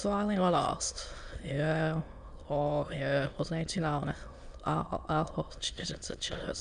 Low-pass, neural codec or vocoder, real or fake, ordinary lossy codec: 9.9 kHz; autoencoder, 22.05 kHz, a latent of 192 numbers a frame, VITS, trained on many speakers; fake; Opus, 64 kbps